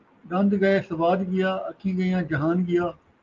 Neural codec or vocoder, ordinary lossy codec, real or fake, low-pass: none; Opus, 24 kbps; real; 7.2 kHz